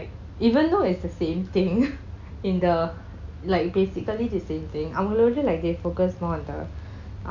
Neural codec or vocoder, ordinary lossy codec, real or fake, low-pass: none; none; real; 7.2 kHz